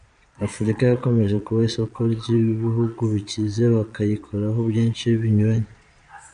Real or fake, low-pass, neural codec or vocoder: fake; 9.9 kHz; vocoder, 22.05 kHz, 80 mel bands, Vocos